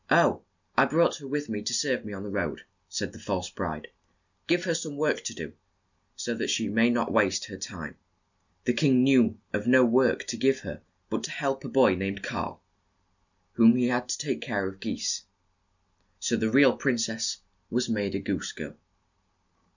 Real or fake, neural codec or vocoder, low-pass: real; none; 7.2 kHz